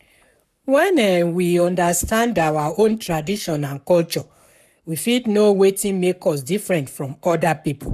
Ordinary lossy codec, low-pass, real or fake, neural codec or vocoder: none; 14.4 kHz; fake; codec, 44.1 kHz, 7.8 kbps, Pupu-Codec